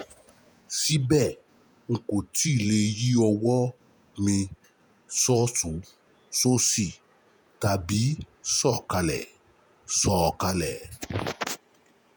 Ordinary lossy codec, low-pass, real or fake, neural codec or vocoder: none; none; fake; vocoder, 48 kHz, 128 mel bands, Vocos